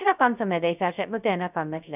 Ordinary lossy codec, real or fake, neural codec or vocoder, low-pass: none; fake; codec, 16 kHz, 0.2 kbps, FocalCodec; 3.6 kHz